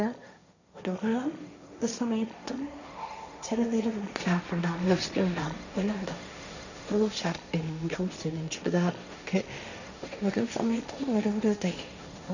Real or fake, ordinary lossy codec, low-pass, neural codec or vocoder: fake; none; 7.2 kHz; codec, 16 kHz, 1.1 kbps, Voila-Tokenizer